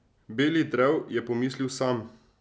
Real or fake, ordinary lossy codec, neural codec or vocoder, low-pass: real; none; none; none